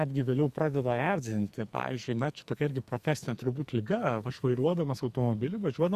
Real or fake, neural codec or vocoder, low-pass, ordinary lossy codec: fake; codec, 44.1 kHz, 2.6 kbps, SNAC; 14.4 kHz; Opus, 64 kbps